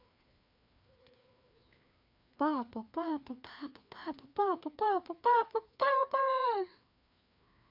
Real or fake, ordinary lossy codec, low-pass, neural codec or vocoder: fake; MP3, 48 kbps; 5.4 kHz; codec, 16 kHz, 2 kbps, FreqCodec, larger model